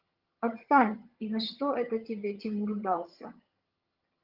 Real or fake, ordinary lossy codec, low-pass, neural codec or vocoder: fake; Opus, 32 kbps; 5.4 kHz; vocoder, 22.05 kHz, 80 mel bands, HiFi-GAN